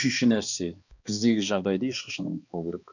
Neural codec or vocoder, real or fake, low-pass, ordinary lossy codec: codec, 16 kHz, 2 kbps, X-Codec, HuBERT features, trained on balanced general audio; fake; 7.2 kHz; none